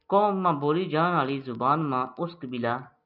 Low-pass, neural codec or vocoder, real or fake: 5.4 kHz; none; real